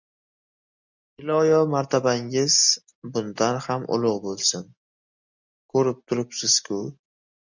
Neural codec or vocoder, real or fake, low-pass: none; real; 7.2 kHz